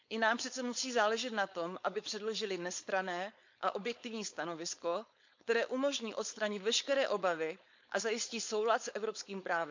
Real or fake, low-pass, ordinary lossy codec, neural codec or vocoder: fake; 7.2 kHz; MP3, 64 kbps; codec, 16 kHz, 4.8 kbps, FACodec